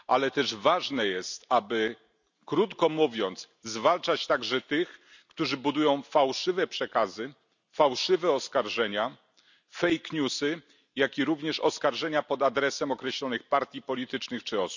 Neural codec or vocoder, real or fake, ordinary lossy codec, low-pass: none; real; none; 7.2 kHz